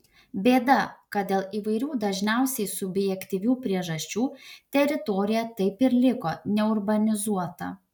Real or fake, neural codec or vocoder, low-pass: real; none; 19.8 kHz